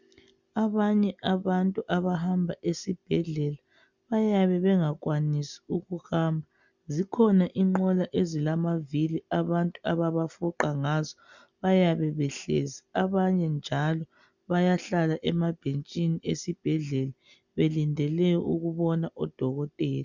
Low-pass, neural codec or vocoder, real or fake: 7.2 kHz; none; real